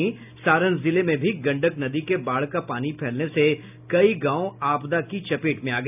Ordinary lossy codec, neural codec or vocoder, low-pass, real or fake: none; none; 3.6 kHz; real